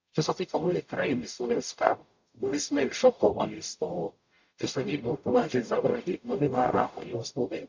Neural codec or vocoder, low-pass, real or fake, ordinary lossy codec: codec, 44.1 kHz, 0.9 kbps, DAC; 7.2 kHz; fake; MP3, 64 kbps